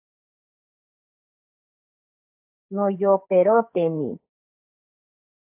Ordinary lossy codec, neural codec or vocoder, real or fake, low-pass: AAC, 32 kbps; codec, 44.1 kHz, 2.6 kbps, SNAC; fake; 3.6 kHz